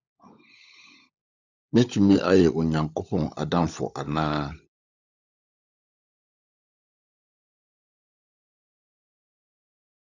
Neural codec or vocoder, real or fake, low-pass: codec, 16 kHz, 16 kbps, FunCodec, trained on LibriTTS, 50 frames a second; fake; 7.2 kHz